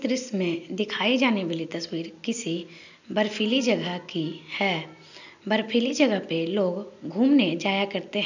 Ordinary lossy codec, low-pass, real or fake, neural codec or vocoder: none; 7.2 kHz; real; none